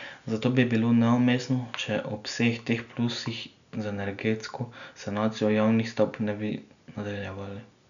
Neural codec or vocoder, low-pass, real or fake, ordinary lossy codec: none; 7.2 kHz; real; none